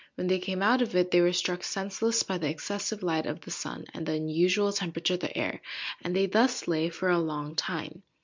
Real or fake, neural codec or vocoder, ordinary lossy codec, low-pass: real; none; MP3, 64 kbps; 7.2 kHz